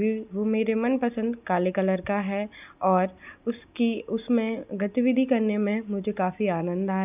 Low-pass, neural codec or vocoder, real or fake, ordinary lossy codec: 3.6 kHz; none; real; none